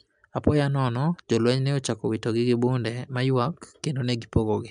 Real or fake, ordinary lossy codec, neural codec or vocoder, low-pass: real; none; none; 9.9 kHz